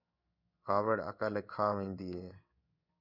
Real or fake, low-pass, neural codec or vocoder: fake; 5.4 kHz; codec, 16 kHz in and 24 kHz out, 1 kbps, XY-Tokenizer